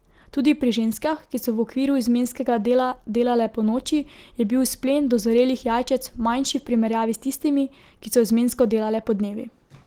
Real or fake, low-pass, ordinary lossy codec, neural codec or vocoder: real; 19.8 kHz; Opus, 16 kbps; none